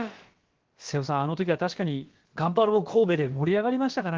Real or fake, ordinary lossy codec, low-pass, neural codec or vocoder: fake; Opus, 16 kbps; 7.2 kHz; codec, 16 kHz, about 1 kbps, DyCAST, with the encoder's durations